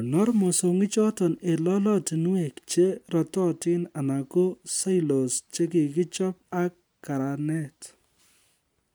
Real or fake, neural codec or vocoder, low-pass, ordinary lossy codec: real; none; none; none